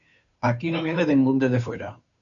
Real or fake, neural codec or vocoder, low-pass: fake; codec, 16 kHz, 2 kbps, FunCodec, trained on Chinese and English, 25 frames a second; 7.2 kHz